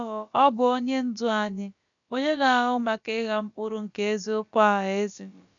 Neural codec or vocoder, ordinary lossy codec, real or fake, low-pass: codec, 16 kHz, about 1 kbps, DyCAST, with the encoder's durations; none; fake; 7.2 kHz